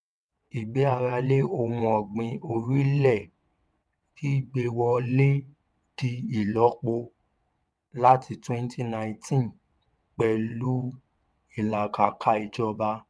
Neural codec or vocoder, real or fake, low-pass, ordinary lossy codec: vocoder, 22.05 kHz, 80 mel bands, WaveNeXt; fake; none; none